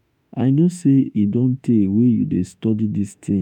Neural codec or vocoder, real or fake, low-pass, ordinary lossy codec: autoencoder, 48 kHz, 32 numbers a frame, DAC-VAE, trained on Japanese speech; fake; 19.8 kHz; none